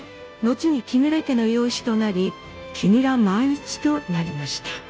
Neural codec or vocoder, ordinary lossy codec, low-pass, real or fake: codec, 16 kHz, 0.5 kbps, FunCodec, trained on Chinese and English, 25 frames a second; none; none; fake